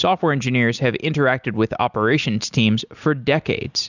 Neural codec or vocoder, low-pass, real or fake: none; 7.2 kHz; real